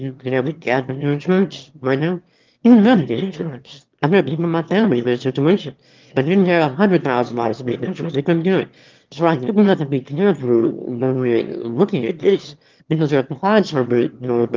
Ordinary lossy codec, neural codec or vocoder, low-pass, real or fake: Opus, 32 kbps; autoencoder, 22.05 kHz, a latent of 192 numbers a frame, VITS, trained on one speaker; 7.2 kHz; fake